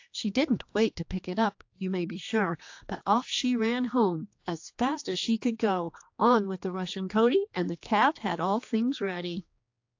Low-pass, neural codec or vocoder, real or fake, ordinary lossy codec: 7.2 kHz; codec, 16 kHz, 2 kbps, X-Codec, HuBERT features, trained on general audio; fake; AAC, 48 kbps